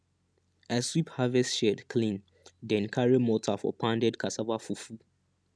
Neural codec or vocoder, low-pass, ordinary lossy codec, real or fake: none; none; none; real